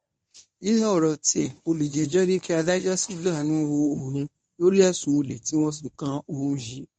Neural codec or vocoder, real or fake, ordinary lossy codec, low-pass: codec, 24 kHz, 0.9 kbps, WavTokenizer, medium speech release version 1; fake; MP3, 48 kbps; 10.8 kHz